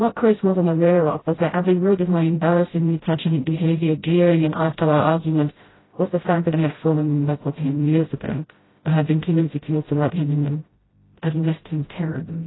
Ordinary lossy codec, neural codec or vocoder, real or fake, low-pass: AAC, 16 kbps; codec, 16 kHz, 0.5 kbps, FreqCodec, smaller model; fake; 7.2 kHz